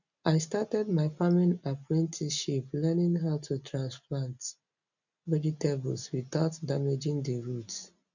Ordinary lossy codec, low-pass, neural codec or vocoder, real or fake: none; 7.2 kHz; none; real